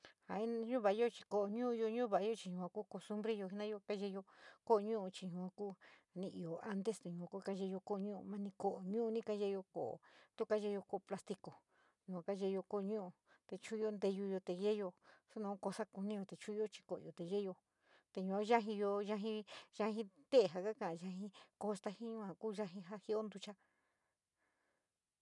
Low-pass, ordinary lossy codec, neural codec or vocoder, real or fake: 10.8 kHz; none; none; real